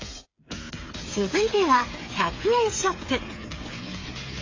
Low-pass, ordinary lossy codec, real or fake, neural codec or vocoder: 7.2 kHz; AAC, 32 kbps; fake; codec, 16 kHz, 8 kbps, FreqCodec, smaller model